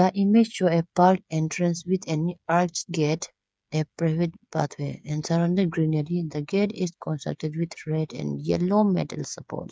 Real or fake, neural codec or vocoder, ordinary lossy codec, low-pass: fake; codec, 16 kHz, 8 kbps, FreqCodec, smaller model; none; none